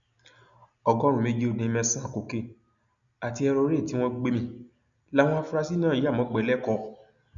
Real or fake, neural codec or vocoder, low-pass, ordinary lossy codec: real; none; 7.2 kHz; none